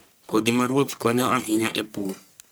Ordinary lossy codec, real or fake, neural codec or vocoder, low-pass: none; fake; codec, 44.1 kHz, 1.7 kbps, Pupu-Codec; none